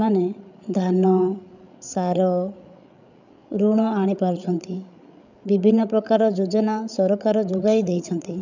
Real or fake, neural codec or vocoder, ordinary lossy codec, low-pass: fake; codec, 16 kHz, 8 kbps, FreqCodec, larger model; none; 7.2 kHz